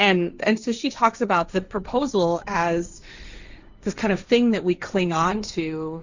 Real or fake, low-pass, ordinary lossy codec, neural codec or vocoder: fake; 7.2 kHz; Opus, 64 kbps; codec, 16 kHz, 1.1 kbps, Voila-Tokenizer